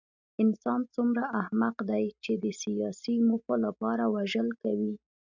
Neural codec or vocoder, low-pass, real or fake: none; 7.2 kHz; real